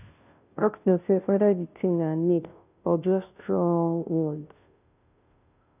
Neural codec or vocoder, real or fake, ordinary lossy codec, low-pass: codec, 16 kHz, 0.5 kbps, FunCodec, trained on Chinese and English, 25 frames a second; fake; none; 3.6 kHz